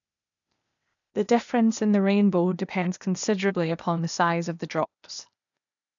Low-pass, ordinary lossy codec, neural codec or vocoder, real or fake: 7.2 kHz; none; codec, 16 kHz, 0.8 kbps, ZipCodec; fake